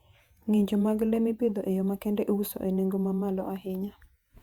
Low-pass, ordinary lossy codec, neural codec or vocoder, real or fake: 19.8 kHz; Opus, 64 kbps; vocoder, 48 kHz, 128 mel bands, Vocos; fake